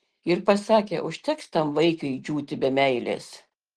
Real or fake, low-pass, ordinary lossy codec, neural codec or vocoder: real; 10.8 kHz; Opus, 16 kbps; none